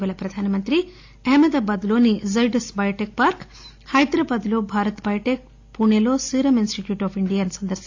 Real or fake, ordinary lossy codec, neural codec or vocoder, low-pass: real; AAC, 48 kbps; none; 7.2 kHz